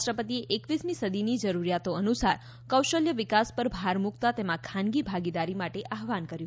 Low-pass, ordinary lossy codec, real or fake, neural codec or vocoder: none; none; real; none